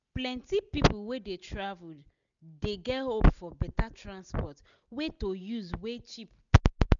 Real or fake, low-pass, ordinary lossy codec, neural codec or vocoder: real; 7.2 kHz; none; none